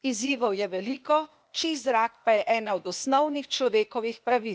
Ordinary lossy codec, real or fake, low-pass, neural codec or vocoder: none; fake; none; codec, 16 kHz, 0.8 kbps, ZipCodec